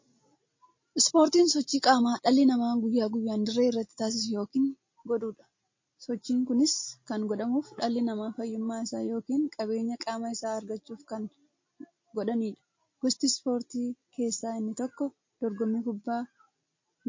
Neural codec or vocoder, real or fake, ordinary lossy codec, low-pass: none; real; MP3, 32 kbps; 7.2 kHz